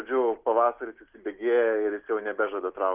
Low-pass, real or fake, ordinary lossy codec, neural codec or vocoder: 3.6 kHz; real; Opus, 32 kbps; none